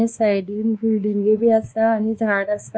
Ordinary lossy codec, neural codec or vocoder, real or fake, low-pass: none; codec, 16 kHz, 2 kbps, X-Codec, WavLM features, trained on Multilingual LibriSpeech; fake; none